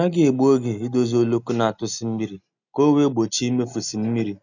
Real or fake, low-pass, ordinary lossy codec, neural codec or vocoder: real; 7.2 kHz; none; none